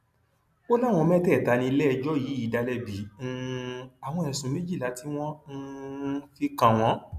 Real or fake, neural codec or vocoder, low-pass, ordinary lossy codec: real; none; 14.4 kHz; AAC, 96 kbps